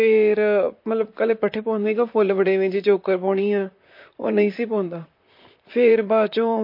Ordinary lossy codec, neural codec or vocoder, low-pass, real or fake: MP3, 32 kbps; vocoder, 44.1 kHz, 128 mel bands, Pupu-Vocoder; 5.4 kHz; fake